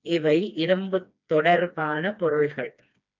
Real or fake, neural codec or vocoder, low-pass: fake; codec, 16 kHz, 2 kbps, FreqCodec, smaller model; 7.2 kHz